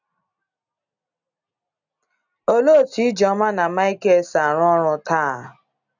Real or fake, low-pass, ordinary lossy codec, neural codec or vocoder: real; 7.2 kHz; none; none